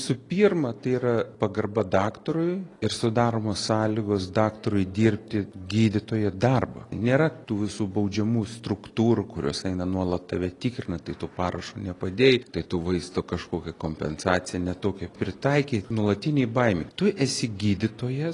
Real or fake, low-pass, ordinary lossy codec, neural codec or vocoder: real; 10.8 kHz; AAC, 32 kbps; none